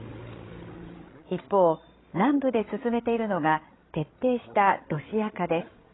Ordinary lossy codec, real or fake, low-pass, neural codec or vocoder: AAC, 16 kbps; fake; 7.2 kHz; codec, 16 kHz, 16 kbps, FreqCodec, larger model